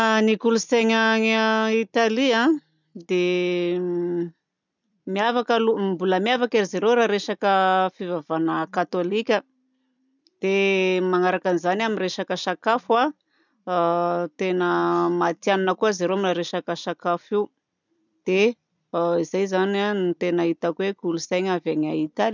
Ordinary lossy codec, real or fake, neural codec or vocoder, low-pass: none; real; none; 7.2 kHz